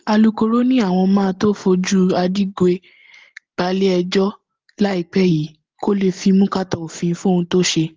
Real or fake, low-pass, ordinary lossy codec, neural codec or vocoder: real; 7.2 kHz; Opus, 16 kbps; none